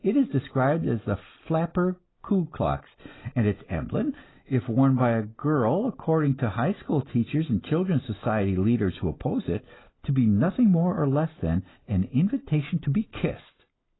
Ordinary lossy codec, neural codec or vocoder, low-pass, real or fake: AAC, 16 kbps; none; 7.2 kHz; real